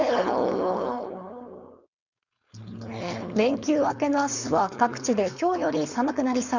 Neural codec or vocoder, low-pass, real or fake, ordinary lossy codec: codec, 16 kHz, 4.8 kbps, FACodec; 7.2 kHz; fake; none